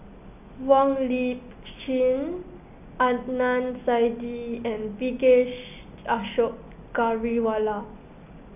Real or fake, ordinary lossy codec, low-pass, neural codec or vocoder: real; none; 3.6 kHz; none